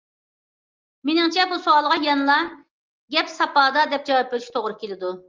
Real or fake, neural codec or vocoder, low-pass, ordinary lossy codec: real; none; 7.2 kHz; Opus, 32 kbps